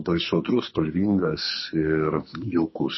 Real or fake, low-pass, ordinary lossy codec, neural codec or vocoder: fake; 7.2 kHz; MP3, 24 kbps; codec, 44.1 kHz, 2.6 kbps, SNAC